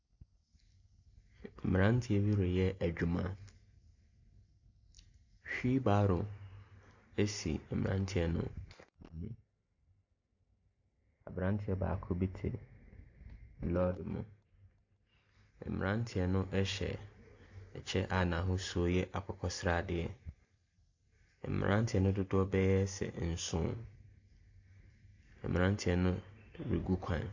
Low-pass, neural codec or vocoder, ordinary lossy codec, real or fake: 7.2 kHz; none; AAC, 48 kbps; real